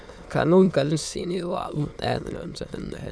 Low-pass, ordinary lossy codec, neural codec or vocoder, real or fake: none; none; autoencoder, 22.05 kHz, a latent of 192 numbers a frame, VITS, trained on many speakers; fake